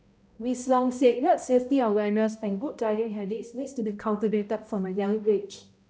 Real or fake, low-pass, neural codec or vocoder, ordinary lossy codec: fake; none; codec, 16 kHz, 0.5 kbps, X-Codec, HuBERT features, trained on balanced general audio; none